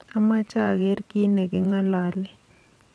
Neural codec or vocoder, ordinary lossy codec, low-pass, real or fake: vocoder, 22.05 kHz, 80 mel bands, WaveNeXt; none; none; fake